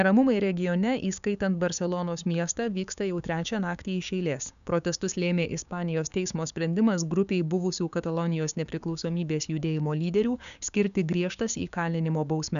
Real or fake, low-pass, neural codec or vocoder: fake; 7.2 kHz; codec, 16 kHz, 6 kbps, DAC